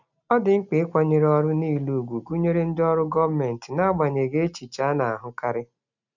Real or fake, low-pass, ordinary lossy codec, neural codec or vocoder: real; 7.2 kHz; none; none